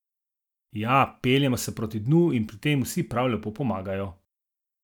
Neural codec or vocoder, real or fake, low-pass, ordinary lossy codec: none; real; 19.8 kHz; none